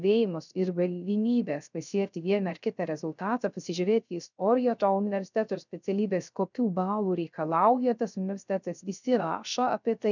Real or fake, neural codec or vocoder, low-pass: fake; codec, 16 kHz, 0.3 kbps, FocalCodec; 7.2 kHz